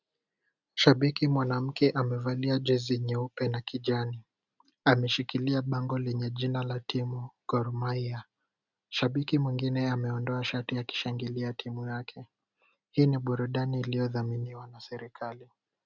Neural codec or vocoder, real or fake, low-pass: none; real; 7.2 kHz